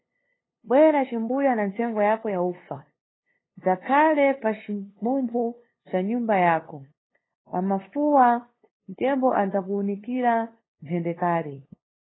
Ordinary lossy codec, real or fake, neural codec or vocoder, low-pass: AAC, 16 kbps; fake; codec, 16 kHz, 2 kbps, FunCodec, trained on LibriTTS, 25 frames a second; 7.2 kHz